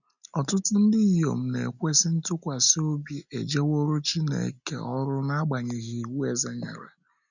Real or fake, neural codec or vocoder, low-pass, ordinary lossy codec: real; none; 7.2 kHz; none